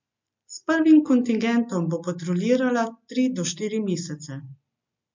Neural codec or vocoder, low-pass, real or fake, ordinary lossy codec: none; 7.2 kHz; real; MP3, 64 kbps